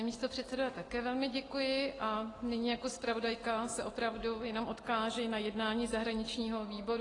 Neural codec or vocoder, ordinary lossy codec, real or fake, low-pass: none; AAC, 32 kbps; real; 10.8 kHz